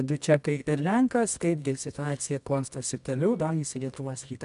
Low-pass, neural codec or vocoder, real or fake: 10.8 kHz; codec, 24 kHz, 0.9 kbps, WavTokenizer, medium music audio release; fake